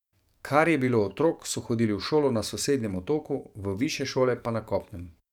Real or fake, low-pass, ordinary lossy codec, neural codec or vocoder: fake; 19.8 kHz; none; codec, 44.1 kHz, 7.8 kbps, DAC